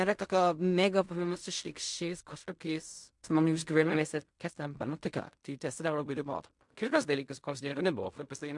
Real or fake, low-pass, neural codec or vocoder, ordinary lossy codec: fake; 10.8 kHz; codec, 16 kHz in and 24 kHz out, 0.4 kbps, LongCat-Audio-Codec, fine tuned four codebook decoder; MP3, 64 kbps